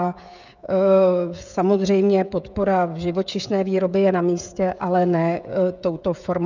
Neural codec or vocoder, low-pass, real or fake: codec, 16 kHz, 16 kbps, FreqCodec, smaller model; 7.2 kHz; fake